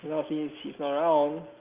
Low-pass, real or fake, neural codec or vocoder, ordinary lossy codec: 3.6 kHz; real; none; Opus, 24 kbps